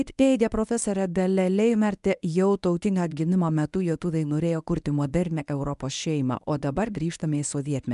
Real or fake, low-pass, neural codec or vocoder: fake; 10.8 kHz; codec, 24 kHz, 0.9 kbps, WavTokenizer, medium speech release version 1